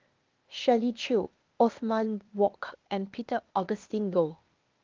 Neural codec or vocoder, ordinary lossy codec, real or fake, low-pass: codec, 16 kHz, 0.8 kbps, ZipCodec; Opus, 32 kbps; fake; 7.2 kHz